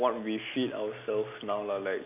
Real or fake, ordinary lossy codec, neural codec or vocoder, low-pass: real; none; none; 3.6 kHz